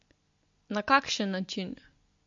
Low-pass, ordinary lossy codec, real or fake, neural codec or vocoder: 7.2 kHz; MP3, 48 kbps; real; none